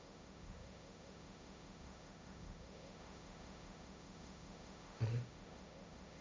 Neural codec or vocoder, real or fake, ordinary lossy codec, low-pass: codec, 16 kHz, 1.1 kbps, Voila-Tokenizer; fake; none; none